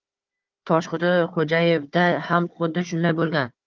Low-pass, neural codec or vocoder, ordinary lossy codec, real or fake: 7.2 kHz; codec, 16 kHz, 4 kbps, FunCodec, trained on Chinese and English, 50 frames a second; Opus, 32 kbps; fake